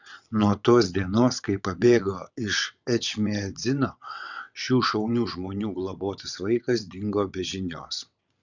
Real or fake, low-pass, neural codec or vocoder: fake; 7.2 kHz; vocoder, 22.05 kHz, 80 mel bands, WaveNeXt